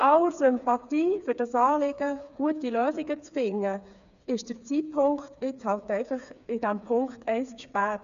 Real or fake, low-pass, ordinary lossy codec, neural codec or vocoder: fake; 7.2 kHz; none; codec, 16 kHz, 4 kbps, FreqCodec, smaller model